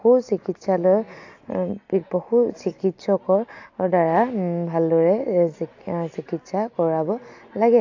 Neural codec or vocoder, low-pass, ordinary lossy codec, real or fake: none; 7.2 kHz; AAC, 48 kbps; real